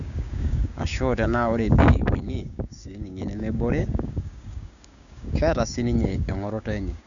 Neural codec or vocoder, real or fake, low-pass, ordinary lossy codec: codec, 16 kHz, 6 kbps, DAC; fake; 7.2 kHz; none